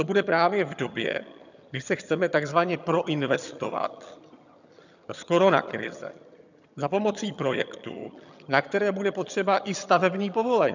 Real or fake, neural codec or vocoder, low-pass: fake; vocoder, 22.05 kHz, 80 mel bands, HiFi-GAN; 7.2 kHz